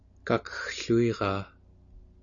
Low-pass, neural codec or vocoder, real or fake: 7.2 kHz; none; real